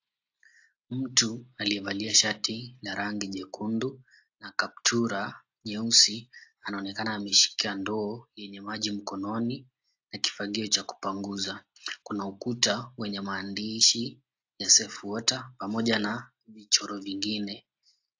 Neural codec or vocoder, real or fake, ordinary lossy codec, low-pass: none; real; AAC, 48 kbps; 7.2 kHz